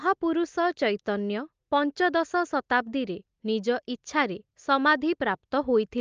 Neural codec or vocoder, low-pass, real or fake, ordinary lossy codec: none; 7.2 kHz; real; Opus, 24 kbps